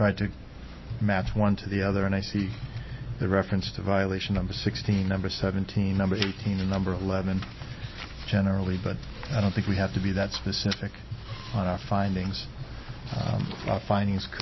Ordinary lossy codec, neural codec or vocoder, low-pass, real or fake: MP3, 24 kbps; none; 7.2 kHz; real